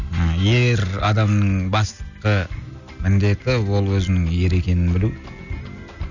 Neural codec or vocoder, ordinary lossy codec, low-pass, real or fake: none; none; 7.2 kHz; real